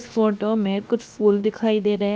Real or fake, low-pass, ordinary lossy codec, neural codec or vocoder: fake; none; none; codec, 16 kHz, 0.7 kbps, FocalCodec